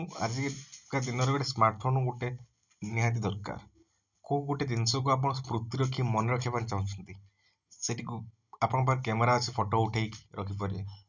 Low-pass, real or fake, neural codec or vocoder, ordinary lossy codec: 7.2 kHz; real; none; none